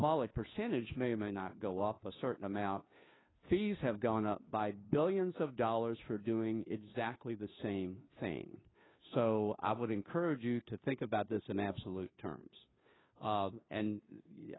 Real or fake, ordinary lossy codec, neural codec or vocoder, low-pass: fake; AAC, 16 kbps; codec, 16 kHz, 2 kbps, FunCodec, trained on Chinese and English, 25 frames a second; 7.2 kHz